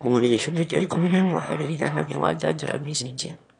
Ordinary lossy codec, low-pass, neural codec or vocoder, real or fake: none; 9.9 kHz; autoencoder, 22.05 kHz, a latent of 192 numbers a frame, VITS, trained on one speaker; fake